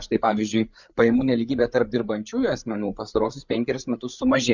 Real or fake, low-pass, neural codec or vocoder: fake; 7.2 kHz; codec, 16 kHz, 8 kbps, FreqCodec, larger model